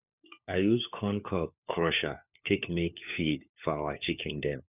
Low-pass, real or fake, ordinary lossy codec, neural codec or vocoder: 3.6 kHz; fake; none; codec, 16 kHz, 4 kbps, FunCodec, trained on LibriTTS, 50 frames a second